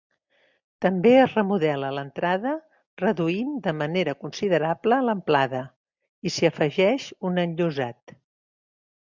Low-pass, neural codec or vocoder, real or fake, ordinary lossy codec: 7.2 kHz; none; real; MP3, 64 kbps